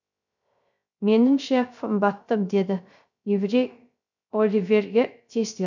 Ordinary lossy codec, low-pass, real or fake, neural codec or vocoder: none; 7.2 kHz; fake; codec, 16 kHz, 0.3 kbps, FocalCodec